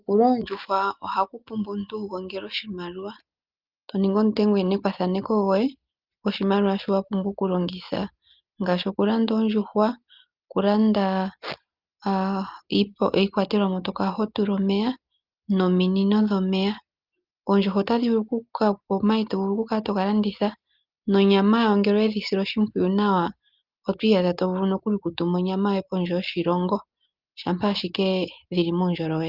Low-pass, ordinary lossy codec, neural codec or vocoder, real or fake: 5.4 kHz; Opus, 32 kbps; none; real